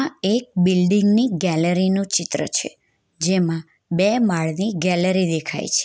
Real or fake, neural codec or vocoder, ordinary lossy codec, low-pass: real; none; none; none